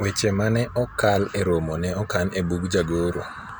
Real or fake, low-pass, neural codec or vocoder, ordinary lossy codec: real; none; none; none